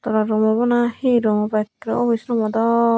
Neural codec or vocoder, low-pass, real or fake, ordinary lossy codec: none; none; real; none